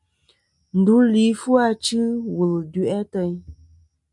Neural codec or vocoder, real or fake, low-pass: none; real; 10.8 kHz